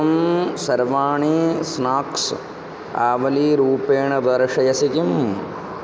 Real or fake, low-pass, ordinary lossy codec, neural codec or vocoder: real; none; none; none